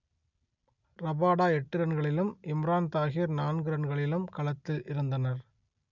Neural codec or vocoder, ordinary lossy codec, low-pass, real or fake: none; none; 7.2 kHz; real